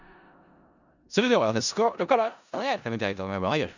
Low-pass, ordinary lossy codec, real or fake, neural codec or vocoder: 7.2 kHz; none; fake; codec, 16 kHz in and 24 kHz out, 0.4 kbps, LongCat-Audio-Codec, four codebook decoder